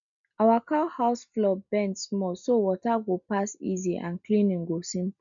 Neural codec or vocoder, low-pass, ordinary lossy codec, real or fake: none; 7.2 kHz; none; real